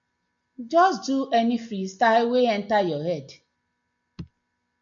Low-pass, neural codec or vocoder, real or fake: 7.2 kHz; none; real